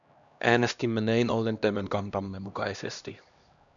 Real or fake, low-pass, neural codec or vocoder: fake; 7.2 kHz; codec, 16 kHz, 1 kbps, X-Codec, HuBERT features, trained on LibriSpeech